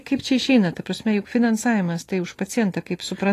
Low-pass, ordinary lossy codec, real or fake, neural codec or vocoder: 14.4 kHz; AAC, 48 kbps; real; none